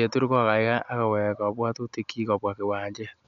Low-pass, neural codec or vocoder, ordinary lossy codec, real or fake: 7.2 kHz; none; none; real